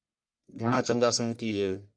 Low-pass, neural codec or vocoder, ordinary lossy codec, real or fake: 9.9 kHz; codec, 44.1 kHz, 1.7 kbps, Pupu-Codec; MP3, 96 kbps; fake